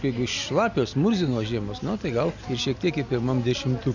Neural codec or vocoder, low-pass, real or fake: none; 7.2 kHz; real